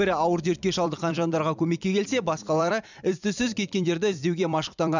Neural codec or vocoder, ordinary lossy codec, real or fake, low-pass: vocoder, 44.1 kHz, 128 mel bands every 256 samples, BigVGAN v2; none; fake; 7.2 kHz